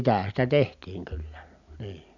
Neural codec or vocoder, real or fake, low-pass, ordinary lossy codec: none; real; 7.2 kHz; none